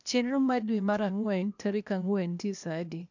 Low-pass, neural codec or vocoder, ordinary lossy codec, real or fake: 7.2 kHz; codec, 16 kHz, 0.8 kbps, ZipCodec; none; fake